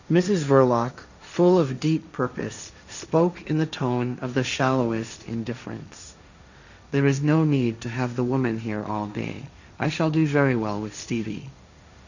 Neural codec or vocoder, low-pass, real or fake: codec, 16 kHz, 1.1 kbps, Voila-Tokenizer; 7.2 kHz; fake